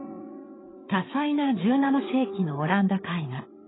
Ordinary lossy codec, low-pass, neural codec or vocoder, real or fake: AAC, 16 kbps; 7.2 kHz; codec, 16 kHz, 8 kbps, FreqCodec, larger model; fake